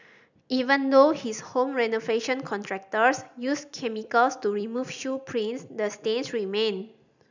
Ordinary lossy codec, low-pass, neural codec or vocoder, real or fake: none; 7.2 kHz; none; real